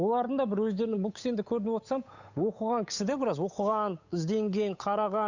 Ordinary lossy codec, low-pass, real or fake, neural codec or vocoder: none; 7.2 kHz; fake; codec, 16 kHz, 8 kbps, FunCodec, trained on Chinese and English, 25 frames a second